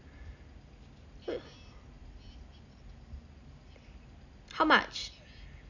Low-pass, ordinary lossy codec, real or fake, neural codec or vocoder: 7.2 kHz; none; real; none